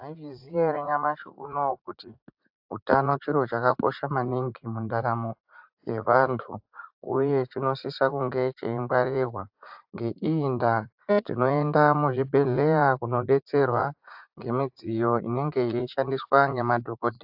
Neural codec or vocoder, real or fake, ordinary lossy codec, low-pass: vocoder, 44.1 kHz, 80 mel bands, Vocos; fake; AAC, 48 kbps; 5.4 kHz